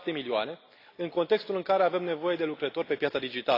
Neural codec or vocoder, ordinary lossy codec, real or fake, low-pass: none; AAC, 32 kbps; real; 5.4 kHz